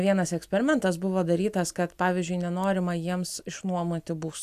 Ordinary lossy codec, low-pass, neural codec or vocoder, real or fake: AAC, 96 kbps; 14.4 kHz; none; real